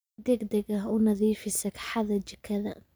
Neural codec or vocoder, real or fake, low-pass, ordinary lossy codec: none; real; none; none